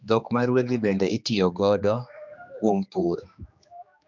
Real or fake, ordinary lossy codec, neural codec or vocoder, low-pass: fake; MP3, 64 kbps; codec, 16 kHz, 2 kbps, X-Codec, HuBERT features, trained on general audio; 7.2 kHz